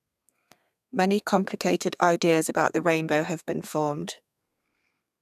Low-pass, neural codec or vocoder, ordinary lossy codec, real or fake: 14.4 kHz; codec, 32 kHz, 1.9 kbps, SNAC; none; fake